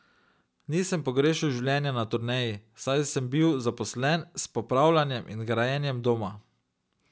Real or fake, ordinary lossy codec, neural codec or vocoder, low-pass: real; none; none; none